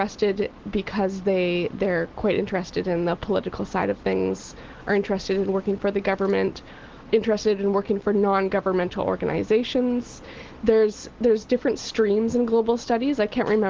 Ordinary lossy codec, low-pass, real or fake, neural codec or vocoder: Opus, 16 kbps; 7.2 kHz; real; none